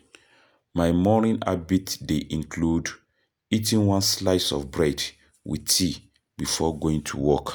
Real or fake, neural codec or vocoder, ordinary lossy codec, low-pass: real; none; none; none